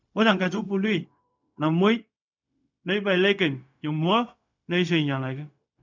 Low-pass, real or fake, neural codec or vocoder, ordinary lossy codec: 7.2 kHz; fake; codec, 16 kHz, 0.4 kbps, LongCat-Audio-Codec; none